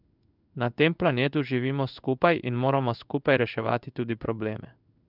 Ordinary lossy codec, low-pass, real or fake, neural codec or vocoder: none; 5.4 kHz; fake; codec, 16 kHz in and 24 kHz out, 1 kbps, XY-Tokenizer